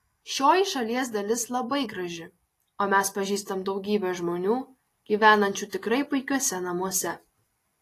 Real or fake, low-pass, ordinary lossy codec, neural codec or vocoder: real; 14.4 kHz; AAC, 48 kbps; none